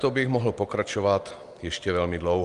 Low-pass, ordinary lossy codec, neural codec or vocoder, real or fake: 10.8 kHz; Opus, 32 kbps; none; real